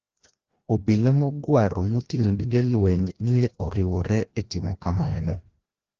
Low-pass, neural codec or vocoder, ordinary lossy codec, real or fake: 7.2 kHz; codec, 16 kHz, 1 kbps, FreqCodec, larger model; Opus, 16 kbps; fake